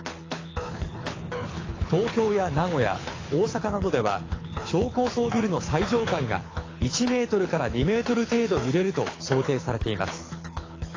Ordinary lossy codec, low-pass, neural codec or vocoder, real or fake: AAC, 32 kbps; 7.2 kHz; codec, 24 kHz, 6 kbps, HILCodec; fake